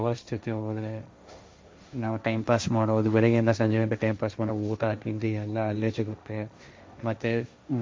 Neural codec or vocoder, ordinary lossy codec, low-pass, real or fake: codec, 16 kHz, 1.1 kbps, Voila-Tokenizer; none; none; fake